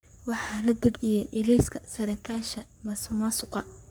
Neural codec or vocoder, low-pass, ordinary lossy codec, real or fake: codec, 44.1 kHz, 3.4 kbps, Pupu-Codec; none; none; fake